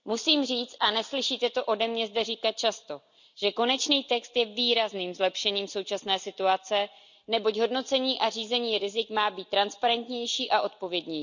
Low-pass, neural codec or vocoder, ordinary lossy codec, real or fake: 7.2 kHz; none; none; real